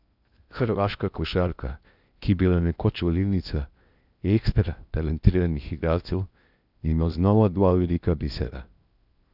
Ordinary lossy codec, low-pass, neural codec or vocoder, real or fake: none; 5.4 kHz; codec, 16 kHz in and 24 kHz out, 0.8 kbps, FocalCodec, streaming, 65536 codes; fake